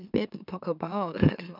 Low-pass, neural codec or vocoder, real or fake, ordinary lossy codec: 5.4 kHz; autoencoder, 44.1 kHz, a latent of 192 numbers a frame, MeloTTS; fake; none